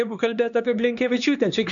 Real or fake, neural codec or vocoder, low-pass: fake; codec, 16 kHz, 2 kbps, X-Codec, HuBERT features, trained on LibriSpeech; 7.2 kHz